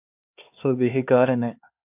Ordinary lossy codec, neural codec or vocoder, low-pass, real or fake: AAC, 32 kbps; codec, 16 kHz, 2 kbps, X-Codec, HuBERT features, trained on LibriSpeech; 3.6 kHz; fake